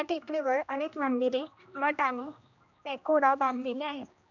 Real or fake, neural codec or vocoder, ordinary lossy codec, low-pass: fake; codec, 16 kHz, 1 kbps, X-Codec, HuBERT features, trained on general audio; none; 7.2 kHz